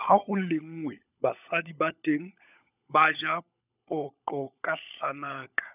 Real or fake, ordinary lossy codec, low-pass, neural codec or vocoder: fake; none; 3.6 kHz; codec, 16 kHz, 16 kbps, FunCodec, trained on LibriTTS, 50 frames a second